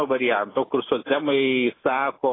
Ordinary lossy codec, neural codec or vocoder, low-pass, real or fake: AAC, 16 kbps; none; 7.2 kHz; real